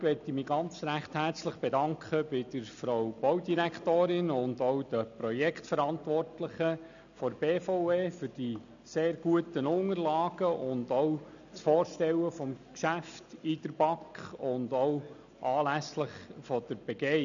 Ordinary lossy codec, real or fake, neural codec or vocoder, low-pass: none; real; none; 7.2 kHz